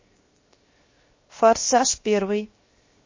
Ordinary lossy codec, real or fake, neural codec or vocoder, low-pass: MP3, 32 kbps; fake; codec, 16 kHz, 0.7 kbps, FocalCodec; 7.2 kHz